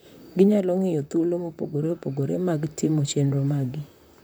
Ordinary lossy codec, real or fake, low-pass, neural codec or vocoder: none; fake; none; vocoder, 44.1 kHz, 128 mel bands, Pupu-Vocoder